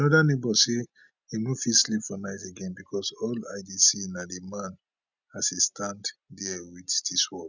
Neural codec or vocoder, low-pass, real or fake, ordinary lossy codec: none; 7.2 kHz; real; none